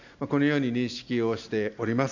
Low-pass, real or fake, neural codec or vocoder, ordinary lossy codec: 7.2 kHz; real; none; none